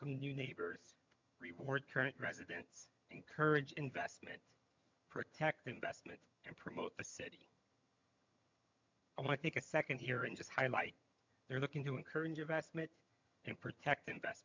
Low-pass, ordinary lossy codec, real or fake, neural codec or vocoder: 7.2 kHz; MP3, 64 kbps; fake; vocoder, 22.05 kHz, 80 mel bands, HiFi-GAN